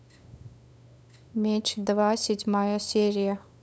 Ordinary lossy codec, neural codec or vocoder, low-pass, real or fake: none; codec, 16 kHz, 8 kbps, FunCodec, trained on LibriTTS, 25 frames a second; none; fake